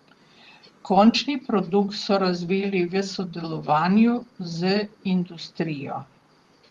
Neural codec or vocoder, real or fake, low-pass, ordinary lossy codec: vocoder, 44.1 kHz, 128 mel bands, Pupu-Vocoder; fake; 19.8 kHz; Opus, 24 kbps